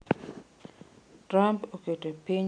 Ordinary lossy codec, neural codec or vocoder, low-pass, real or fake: none; none; 9.9 kHz; real